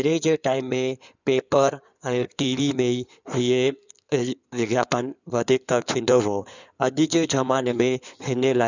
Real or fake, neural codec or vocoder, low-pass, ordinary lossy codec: fake; codec, 16 kHz in and 24 kHz out, 2.2 kbps, FireRedTTS-2 codec; 7.2 kHz; none